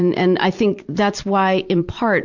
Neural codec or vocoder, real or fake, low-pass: vocoder, 44.1 kHz, 128 mel bands every 256 samples, BigVGAN v2; fake; 7.2 kHz